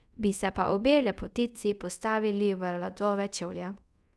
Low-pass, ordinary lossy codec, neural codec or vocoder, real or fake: none; none; codec, 24 kHz, 0.5 kbps, DualCodec; fake